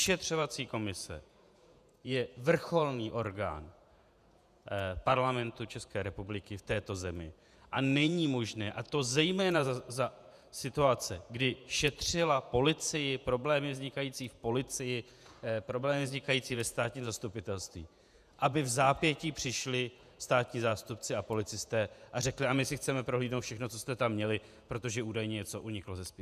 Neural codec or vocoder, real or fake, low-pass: vocoder, 48 kHz, 128 mel bands, Vocos; fake; 14.4 kHz